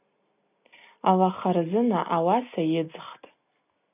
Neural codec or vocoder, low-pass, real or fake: none; 3.6 kHz; real